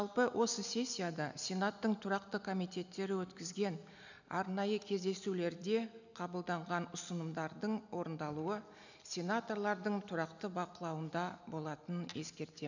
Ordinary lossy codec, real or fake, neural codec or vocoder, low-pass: none; real; none; 7.2 kHz